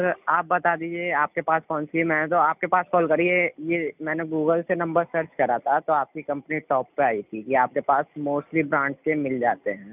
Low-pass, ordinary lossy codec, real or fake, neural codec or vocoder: 3.6 kHz; none; real; none